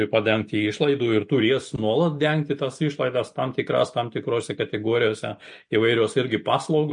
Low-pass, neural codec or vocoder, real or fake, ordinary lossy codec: 9.9 kHz; none; real; MP3, 48 kbps